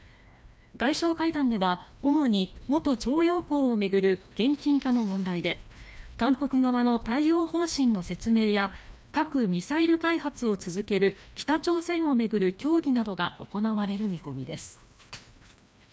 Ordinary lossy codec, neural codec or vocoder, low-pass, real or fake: none; codec, 16 kHz, 1 kbps, FreqCodec, larger model; none; fake